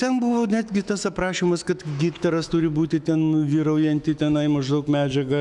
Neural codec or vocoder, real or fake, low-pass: autoencoder, 48 kHz, 128 numbers a frame, DAC-VAE, trained on Japanese speech; fake; 10.8 kHz